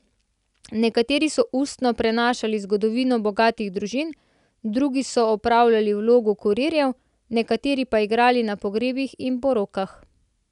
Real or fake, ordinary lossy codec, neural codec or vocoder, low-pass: real; none; none; 10.8 kHz